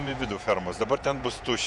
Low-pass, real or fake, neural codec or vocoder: 10.8 kHz; fake; vocoder, 48 kHz, 128 mel bands, Vocos